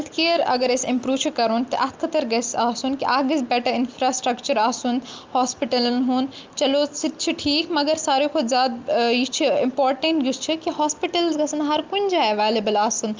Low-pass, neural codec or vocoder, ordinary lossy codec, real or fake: 7.2 kHz; none; Opus, 24 kbps; real